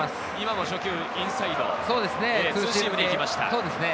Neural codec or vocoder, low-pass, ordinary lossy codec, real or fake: none; none; none; real